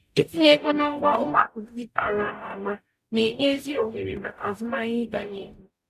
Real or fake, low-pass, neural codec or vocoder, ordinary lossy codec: fake; 14.4 kHz; codec, 44.1 kHz, 0.9 kbps, DAC; none